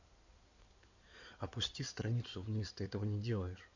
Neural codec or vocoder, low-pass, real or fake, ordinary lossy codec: codec, 16 kHz in and 24 kHz out, 2.2 kbps, FireRedTTS-2 codec; 7.2 kHz; fake; none